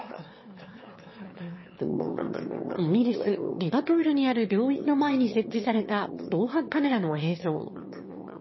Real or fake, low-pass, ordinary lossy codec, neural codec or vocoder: fake; 7.2 kHz; MP3, 24 kbps; autoencoder, 22.05 kHz, a latent of 192 numbers a frame, VITS, trained on one speaker